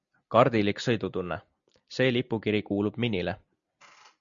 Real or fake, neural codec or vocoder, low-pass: real; none; 7.2 kHz